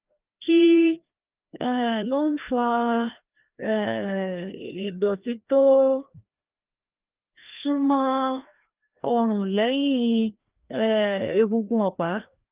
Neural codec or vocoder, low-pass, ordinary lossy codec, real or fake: codec, 16 kHz, 1 kbps, FreqCodec, larger model; 3.6 kHz; Opus, 32 kbps; fake